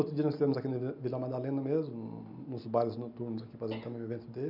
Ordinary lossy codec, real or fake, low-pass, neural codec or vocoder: none; real; 5.4 kHz; none